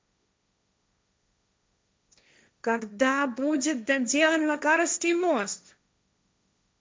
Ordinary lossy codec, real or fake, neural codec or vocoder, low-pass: none; fake; codec, 16 kHz, 1.1 kbps, Voila-Tokenizer; none